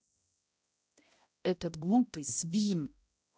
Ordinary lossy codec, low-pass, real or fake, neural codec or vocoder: none; none; fake; codec, 16 kHz, 0.5 kbps, X-Codec, HuBERT features, trained on balanced general audio